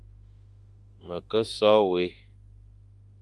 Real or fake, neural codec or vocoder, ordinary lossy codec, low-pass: fake; autoencoder, 48 kHz, 32 numbers a frame, DAC-VAE, trained on Japanese speech; Opus, 32 kbps; 10.8 kHz